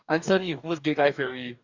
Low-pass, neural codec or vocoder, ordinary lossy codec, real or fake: 7.2 kHz; codec, 44.1 kHz, 2.6 kbps, DAC; none; fake